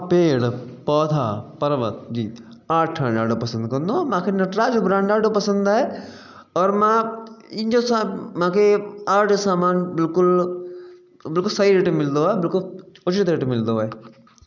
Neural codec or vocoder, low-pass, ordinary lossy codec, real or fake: none; 7.2 kHz; none; real